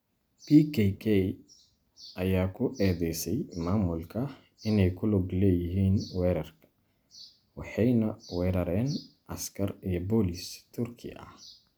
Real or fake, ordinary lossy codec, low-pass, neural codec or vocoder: real; none; none; none